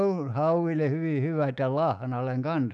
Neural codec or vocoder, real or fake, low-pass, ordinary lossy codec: autoencoder, 48 kHz, 128 numbers a frame, DAC-VAE, trained on Japanese speech; fake; 10.8 kHz; none